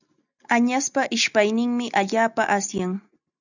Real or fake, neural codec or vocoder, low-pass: real; none; 7.2 kHz